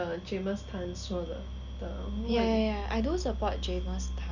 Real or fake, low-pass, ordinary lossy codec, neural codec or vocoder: real; 7.2 kHz; none; none